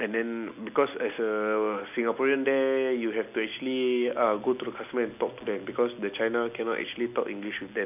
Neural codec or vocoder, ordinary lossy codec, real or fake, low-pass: none; none; real; 3.6 kHz